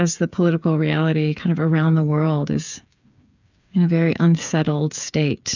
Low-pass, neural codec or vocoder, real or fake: 7.2 kHz; codec, 16 kHz, 8 kbps, FreqCodec, smaller model; fake